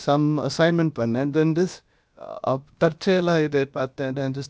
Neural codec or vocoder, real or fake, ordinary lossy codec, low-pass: codec, 16 kHz, about 1 kbps, DyCAST, with the encoder's durations; fake; none; none